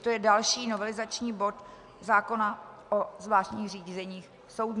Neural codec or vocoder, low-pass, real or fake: none; 10.8 kHz; real